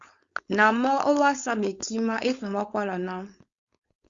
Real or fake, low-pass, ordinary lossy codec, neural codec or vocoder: fake; 7.2 kHz; Opus, 64 kbps; codec, 16 kHz, 4.8 kbps, FACodec